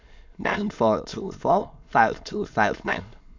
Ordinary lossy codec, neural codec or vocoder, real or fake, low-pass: MP3, 64 kbps; autoencoder, 22.05 kHz, a latent of 192 numbers a frame, VITS, trained on many speakers; fake; 7.2 kHz